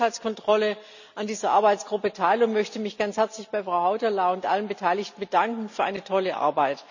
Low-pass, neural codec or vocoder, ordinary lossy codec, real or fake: 7.2 kHz; none; none; real